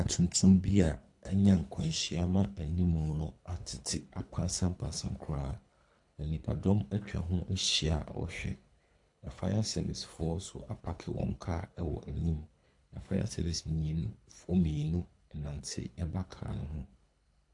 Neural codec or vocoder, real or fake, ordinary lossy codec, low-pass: codec, 24 kHz, 3 kbps, HILCodec; fake; AAC, 64 kbps; 10.8 kHz